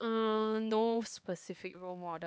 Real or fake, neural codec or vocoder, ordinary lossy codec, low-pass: fake; codec, 16 kHz, 2 kbps, X-Codec, HuBERT features, trained on LibriSpeech; none; none